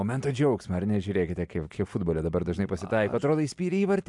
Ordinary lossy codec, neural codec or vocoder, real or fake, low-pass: MP3, 96 kbps; none; real; 10.8 kHz